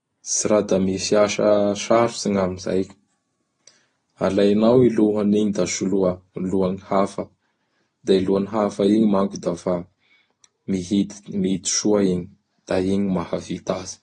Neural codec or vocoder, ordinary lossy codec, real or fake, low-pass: none; AAC, 32 kbps; real; 9.9 kHz